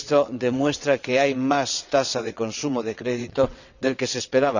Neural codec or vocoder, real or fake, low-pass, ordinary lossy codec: vocoder, 22.05 kHz, 80 mel bands, WaveNeXt; fake; 7.2 kHz; none